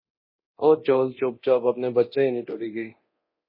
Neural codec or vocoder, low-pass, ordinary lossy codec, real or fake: codec, 24 kHz, 0.9 kbps, DualCodec; 5.4 kHz; MP3, 24 kbps; fake